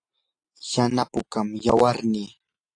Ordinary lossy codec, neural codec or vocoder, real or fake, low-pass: AAC, 48 kbps; none; real; 9.9 kHz